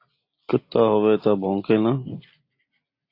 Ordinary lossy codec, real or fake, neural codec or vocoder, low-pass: AAC, 32 kbps; real; none; 5.4 kHz